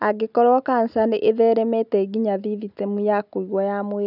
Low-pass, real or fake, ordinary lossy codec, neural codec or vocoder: 5.4 kHz; real; none; none